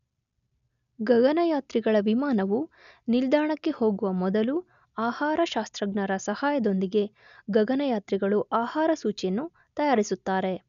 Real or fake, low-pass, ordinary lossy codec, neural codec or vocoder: real; 7.2 kHz; none; none